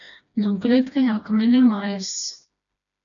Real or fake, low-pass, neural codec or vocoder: fake; 7.2 kHz; codec, 16 kHz, 1 kbps, FreqCodec, smaller model